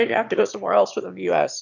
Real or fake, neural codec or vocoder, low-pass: fake; autoencoder, 22.05 kHz, a latent of 192 numbers a frame, VITS, trained on one speaker; 7.2 kHz